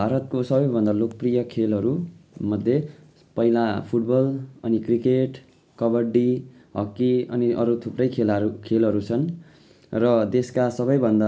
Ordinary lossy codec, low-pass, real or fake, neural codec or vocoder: none; none; real; none